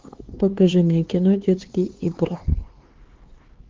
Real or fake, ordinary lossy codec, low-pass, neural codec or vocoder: fake; Opus, 16 kbps; 7.2 kHz; codec, 16 kHz, 4 kbps, X-Codec, HuBERT features, trained on LibriSpeech